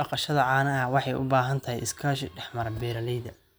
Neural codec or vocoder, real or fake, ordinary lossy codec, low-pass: none; real; none; none